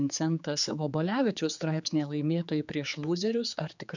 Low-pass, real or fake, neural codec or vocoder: 7.2 kHz; fake; codec, 16 kHz, 4 kbps, X-Codec, HuBERT features, trained on general audio